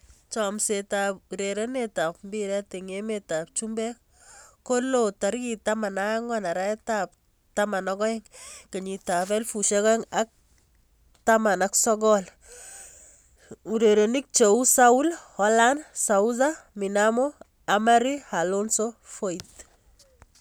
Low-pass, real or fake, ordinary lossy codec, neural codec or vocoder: none; real; none; none